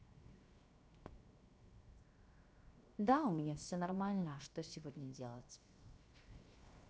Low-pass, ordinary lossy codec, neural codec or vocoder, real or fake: none; none; codec, 16 kHz, 0.7 kbps, FocalCodec; fake